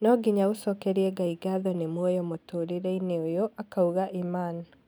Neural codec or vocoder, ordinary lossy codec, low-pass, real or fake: none; none; none; real